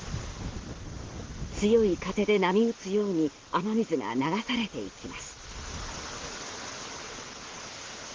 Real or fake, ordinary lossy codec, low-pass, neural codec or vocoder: real; Opus, 16 kbps; 7.2 kHz; none